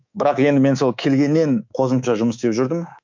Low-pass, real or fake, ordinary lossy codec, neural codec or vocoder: 7.2 kHz; fake; MP3, 48 kbps; codec, 24 kHz, 3.1 kbps, DualCodec